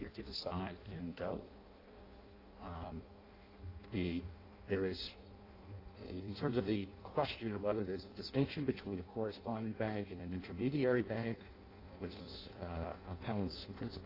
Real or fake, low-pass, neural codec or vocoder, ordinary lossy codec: fake; 5.4 kHz; codec, 16 kHz in and 24 kHz out, 0.6 kbps, FireRedTTS-2 codec; AAC, 24 kbps